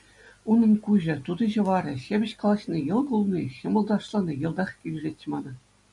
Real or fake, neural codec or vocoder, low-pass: real; none; 10.8 kHz